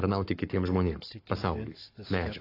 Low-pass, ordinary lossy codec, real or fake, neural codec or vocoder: 5.4 kHz; AAC, 32 kbps; fake; codec, 44.1 kHz, 7.8 kbps, Pupu-Codec